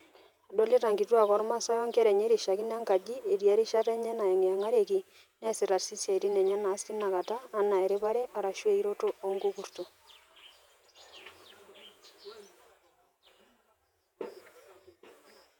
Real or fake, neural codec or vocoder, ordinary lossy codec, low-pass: fake; vocoder, 48 kHz, 128 mel bands, Vocos; none; 19.8 kHz